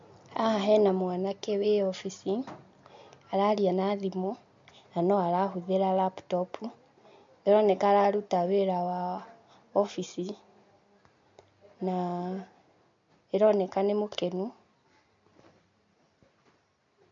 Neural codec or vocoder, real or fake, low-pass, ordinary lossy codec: none; real; 7.2 kHz; MP3, 48 kbps